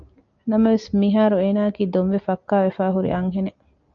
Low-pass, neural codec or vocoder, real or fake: 7.2 kHz; none; real